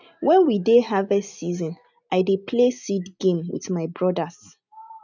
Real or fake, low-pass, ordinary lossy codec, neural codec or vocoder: real; 7.2 kHz; none; none